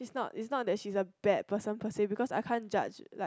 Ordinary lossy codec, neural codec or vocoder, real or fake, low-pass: none; none; real; none